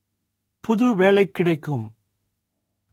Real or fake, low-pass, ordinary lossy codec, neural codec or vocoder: fake; 19.8 kHz; AAC, 48 kbps; autoencoder, 48 kHz, 32 numbers a frame, DAC-VAE, trained on Japanese speech